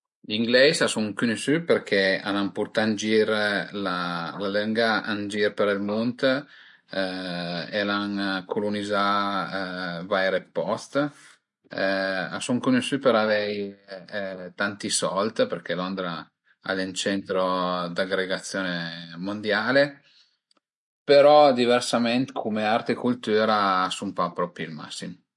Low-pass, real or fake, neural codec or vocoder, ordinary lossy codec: 10.8 kHz; real; none; MP3, 48 kbps